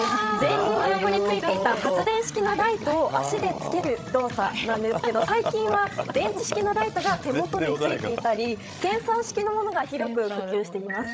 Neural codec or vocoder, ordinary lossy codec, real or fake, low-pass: codec, 16 kHz, 16 kbps, FreqCodec, larger model; none; fake; none